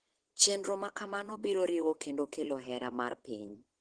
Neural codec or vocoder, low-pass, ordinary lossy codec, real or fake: vocoder, 22.05 kHz, 80 mel bands, WaveNeXt; 9.9 kHz; Opus, 16 kbps; fake